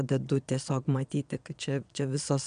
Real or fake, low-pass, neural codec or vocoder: fake; 9.9 kHz; vocoder, 22.05 kHz, 80 mel bands, Vocos